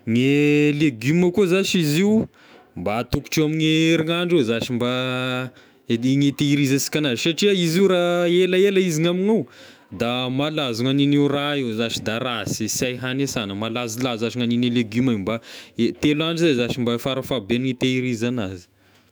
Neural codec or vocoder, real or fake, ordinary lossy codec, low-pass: autoencoder, 48 kHz, 128 numbers a frame, DAC-VAE, trained on Japanese speech; fake; none; none